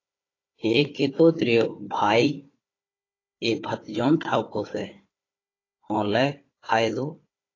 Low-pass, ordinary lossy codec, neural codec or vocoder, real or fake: 7.2 kHz; AAC, 32 kbps; codec, 16 kHz, 4 kbps, FunCodec, trained on Chinese and English, 50 frames a second; fake